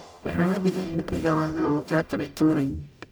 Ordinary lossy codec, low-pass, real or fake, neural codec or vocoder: none; 19.8 kHz; fake; codec, 44.1 kHz, 0.9 kbps, DAC